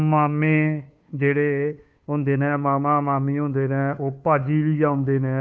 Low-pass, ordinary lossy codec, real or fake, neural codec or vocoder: none; none; fake; codec, 16 kHz, 2 kbps, FunCodec, trained on Chinese and English, 25 frames a second